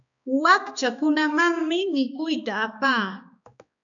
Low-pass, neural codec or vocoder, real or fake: 7.2 kHz; codec, 16 kHz, 2 kbps, X-Codec, HuBERT features, trained on balanced general audio; fake